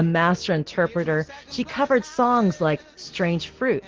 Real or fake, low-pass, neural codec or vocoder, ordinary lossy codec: real; 7.2 kHz; none; Opus, 16 kbps